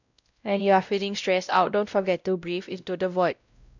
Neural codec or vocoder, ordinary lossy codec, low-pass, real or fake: codec, 16 kHz, 0.5 kbps, X-Codec, WavLM features, trained on Multilingual LibriSpeech; none; 7.2 kHz; fake